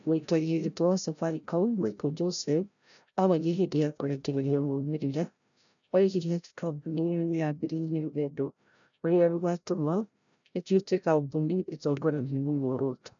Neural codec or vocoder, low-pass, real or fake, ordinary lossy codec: codec, 16 kHz, 0.5 kbps, FreqCodec, larger model; 7.2 kHz; fake; none